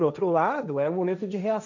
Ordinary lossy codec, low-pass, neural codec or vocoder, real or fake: none; 7.2 kHz; codec, 16 kHz, 1.1 kbps, Voila-Tokenizer; fake